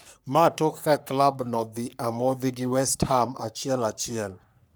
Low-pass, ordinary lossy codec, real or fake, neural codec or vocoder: none; none; fake; codec, 44.1 kHz, 3.4 kbps, Pupu-Codec